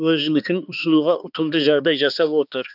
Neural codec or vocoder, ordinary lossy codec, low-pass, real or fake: codec, 16 kHz, 2 kbps, X-Codec, HuBERT features, trained on balanced general audio; none; 5.4 kHz; fake